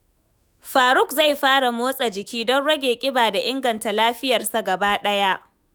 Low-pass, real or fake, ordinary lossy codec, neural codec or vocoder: none; fake; none; autoencoder, 48 kHz, 128 numbers a frame, DAC-VAE, trained on Japanese speech